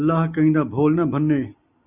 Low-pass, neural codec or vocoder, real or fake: 3.6 kHz; none; real